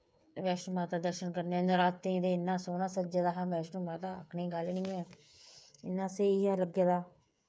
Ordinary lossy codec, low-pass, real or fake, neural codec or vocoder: none; none; fake; codec, 16 kHz, 8 kbps, FreqCodec, smaller model